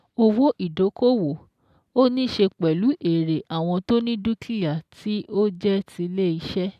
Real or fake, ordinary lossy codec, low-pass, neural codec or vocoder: real; none; 14.4 kHz; none